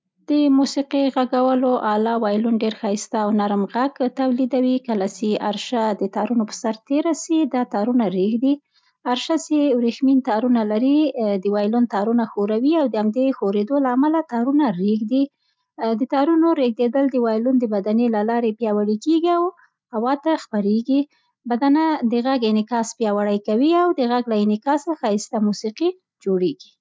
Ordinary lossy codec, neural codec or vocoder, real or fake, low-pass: none; none; real; none